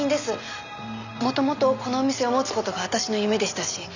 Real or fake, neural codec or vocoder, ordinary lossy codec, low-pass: real; none; none; 7.2 kHz